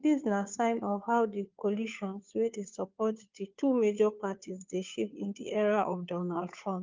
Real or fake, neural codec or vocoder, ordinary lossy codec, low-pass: fake; codec, 16 kHz, 2 kbps, FunCodec, trained on Chinese and English, 25 frames a second; Opus, 24 kbps; 7.2 kHz